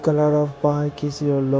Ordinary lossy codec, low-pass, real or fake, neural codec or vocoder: none; none; fake; codec, 16 kHz, 0.9 kbps, LongCat-Audio-Codec